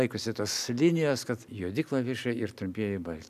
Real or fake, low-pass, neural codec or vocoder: real; 14.4 kHz; none